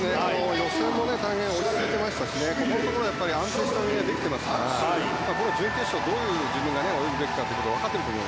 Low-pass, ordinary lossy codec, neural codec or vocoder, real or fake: none; none; none; real